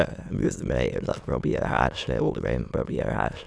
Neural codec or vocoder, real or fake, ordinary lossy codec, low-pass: autoencoder, 22.05 kHz, a latent of 192 numbers a frame, VITS, trained on many speakers; fake; none; none